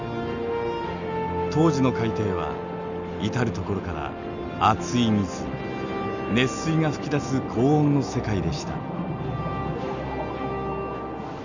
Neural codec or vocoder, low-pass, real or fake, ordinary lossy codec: none; 7.2 kHz; real; none